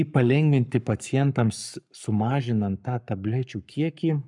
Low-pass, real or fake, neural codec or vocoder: 10.8 kHz; fake; codec, 44.1 kHz, 7.8 kbps, Pupu-Codec